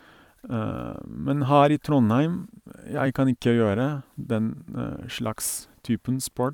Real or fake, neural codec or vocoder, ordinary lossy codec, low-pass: fake; vocoder, 44.1 kHz, 128 mel bands every 256 samples, BigVGAN v2; none; 19.8 kHz